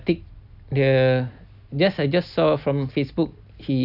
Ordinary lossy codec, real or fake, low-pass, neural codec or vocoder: none; real; 5.4 kHz; none